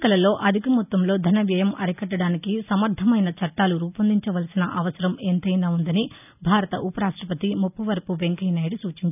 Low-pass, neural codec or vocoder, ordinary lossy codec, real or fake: 3.6 kHz; none; none; real